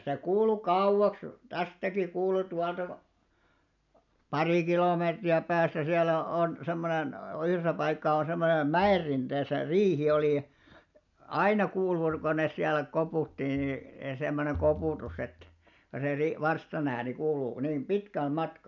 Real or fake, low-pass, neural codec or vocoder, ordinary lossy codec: real; 7.2 kHz; none; none